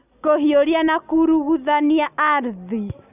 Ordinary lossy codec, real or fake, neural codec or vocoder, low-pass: none; real; none; 3.6 kHz